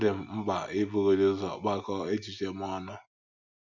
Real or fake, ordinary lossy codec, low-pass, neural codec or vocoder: real; none; 7.2 kHz; none